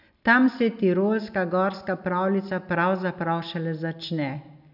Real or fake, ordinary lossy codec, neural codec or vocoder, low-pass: real; none; none; 5.4 kHz